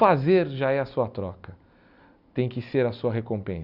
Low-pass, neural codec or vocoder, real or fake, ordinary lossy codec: 5.4 kHz; none; real; none